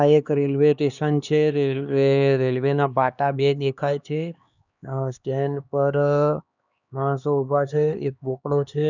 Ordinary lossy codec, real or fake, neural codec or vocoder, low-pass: none; fake; codec, 16 kHz, 2 kbps, X-Codec, HuBERT features, trained on LibriSpeech; 7.2 kHz